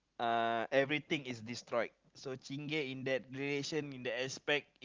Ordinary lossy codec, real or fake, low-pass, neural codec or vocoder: Opus, 32 kbps; real; 7.2 kHz; none